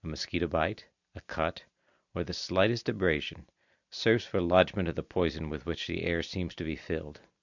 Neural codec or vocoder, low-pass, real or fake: none; 7.2 kHz; real